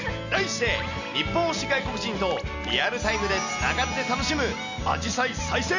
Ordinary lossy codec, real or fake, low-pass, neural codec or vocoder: none; real; 7.2 kHz; none